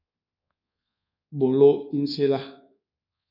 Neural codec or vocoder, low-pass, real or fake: codec, 24 kHz, 1.2 kbps, DualCodec; 5.4 kHz; fake